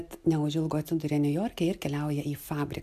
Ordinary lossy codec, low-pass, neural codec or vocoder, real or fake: MP3, 96 kbps; 14.4 kHz; none; real